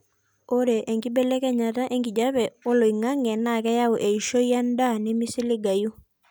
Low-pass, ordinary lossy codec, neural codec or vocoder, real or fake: none; none; none; real